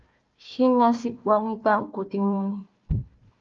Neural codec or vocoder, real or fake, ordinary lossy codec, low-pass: codec, 16 kHz, 1 kbps, FunCodec, trained on Chinese and English, 50 frames a second; fake; Opus, 32 kbps; 7.2 kHz